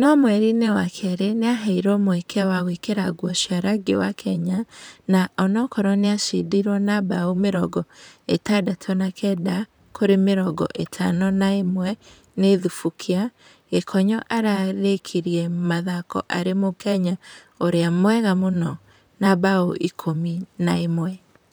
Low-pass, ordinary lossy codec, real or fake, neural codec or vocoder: none; none; fake; vocoder, 44.1 kHz, 128 mel bands, Pupu-Vocoder